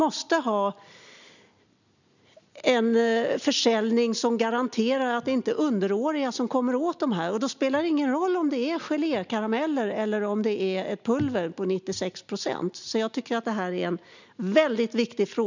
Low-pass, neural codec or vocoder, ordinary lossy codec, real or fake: 7.2 kHz; none; none; real